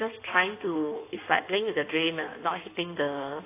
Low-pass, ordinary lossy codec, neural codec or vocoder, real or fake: 3.6 kHz; AAC, 24 kbps; codec, 16 kHz in and 24 kHz out, 1.1 kbps, FireRedTTS-2 codec; fake